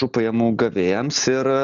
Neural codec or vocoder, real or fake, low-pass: none; real; 7.2 kHz